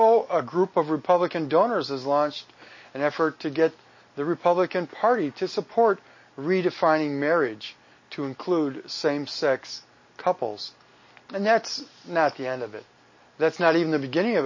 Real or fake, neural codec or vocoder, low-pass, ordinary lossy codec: real; none; 7.2 kHz; MP3, 32 kbps